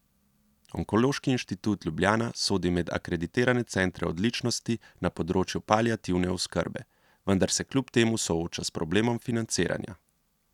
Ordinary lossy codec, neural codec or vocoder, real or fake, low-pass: none; none; real; 19.8 kHz